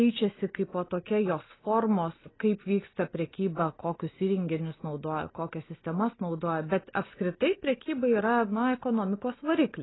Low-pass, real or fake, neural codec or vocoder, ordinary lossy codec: 7.2 kHz; real; none; AAC, 16 kbps